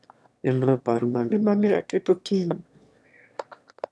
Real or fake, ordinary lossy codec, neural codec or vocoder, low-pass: fake; none; autoencoder, 22.05 kHz, a latent of 192 numbers a frame, VITS, trained on one speaker; none